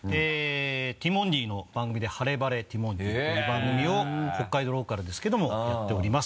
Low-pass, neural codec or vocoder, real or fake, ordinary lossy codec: none; none; real; none